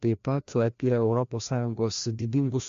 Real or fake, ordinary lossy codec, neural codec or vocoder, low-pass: fake; MP3, 48 kbps; codec, 16 kHz, 1 kbps, FreqCodec, larger model; 7.2 kHz